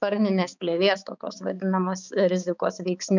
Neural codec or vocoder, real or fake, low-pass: codec, 16 kHz, 4 kbps, X-Codec, HuBERT features, trained on balanced general audio; fake; 7.2 kHz